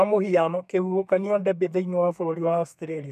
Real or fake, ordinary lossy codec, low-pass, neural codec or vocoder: fake; none; 14.4 kHz; codec, 32 kHz, 1.9 kbps, SNAC